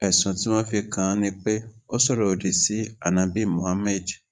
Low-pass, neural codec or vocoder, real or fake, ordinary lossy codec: 9.9 kHz; vocoder, 22.05 kHz, 80 mel bands, Vocos; fake; none